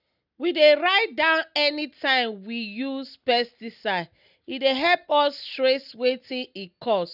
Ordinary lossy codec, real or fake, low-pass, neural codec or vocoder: none; real; 5.4 kHz; none